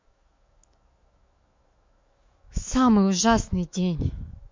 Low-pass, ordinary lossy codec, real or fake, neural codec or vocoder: 7.2 kHz; MP3, 48 kbps; fake; autoencoder, 48 kHz, 128 numbers a frame, DAC-VAE, trained on Japanese speech